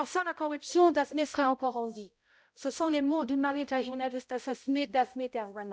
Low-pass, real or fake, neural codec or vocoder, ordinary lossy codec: none; fake; codec, 16 kHz, 0.5 kbps, X-Codec, HuBERT features, trained on balanced general audio; none